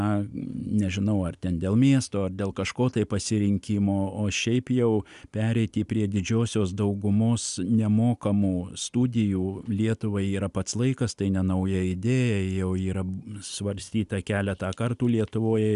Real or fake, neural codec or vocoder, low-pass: real; none; 10.8 kHz